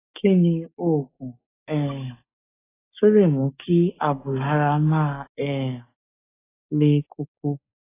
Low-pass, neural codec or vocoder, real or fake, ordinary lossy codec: 3.6 kHz; codec, 16 kHz, 6 kbps, DAC; fake; AAC, 16 kbps